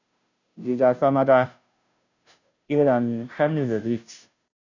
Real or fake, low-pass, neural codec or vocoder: fake; 7.2 kHz; codec, 16 kHz, 0.5 kbps, FunCodec, trained on Chinese and English, 25 frames a second